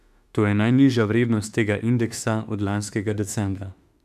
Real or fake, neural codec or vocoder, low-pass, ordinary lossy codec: fake; autoencoder, 48 kHz, 32 numbers a frame, DAC-VAE, trained on Japanese speech; 14.4 kHz; none